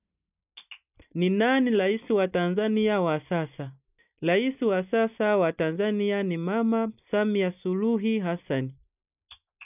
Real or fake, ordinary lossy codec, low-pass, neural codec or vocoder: real; none; 3.6 kHz; none